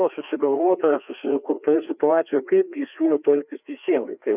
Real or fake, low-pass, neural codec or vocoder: fake; 3.6 kHz; codec, 16 kHz, 2 kbps, FreqCodec, larger model